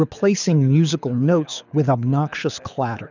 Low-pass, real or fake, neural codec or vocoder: 7.2 kHz; fake; codec, 24 kHz, 6 kbps, HILCodec